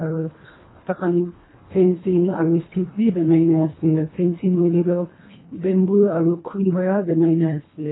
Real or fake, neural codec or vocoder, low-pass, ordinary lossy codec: fake; codec, 24 kHz, 1.5 kbps, HILCodec; 7.2 kHz; AAC, 16 kbps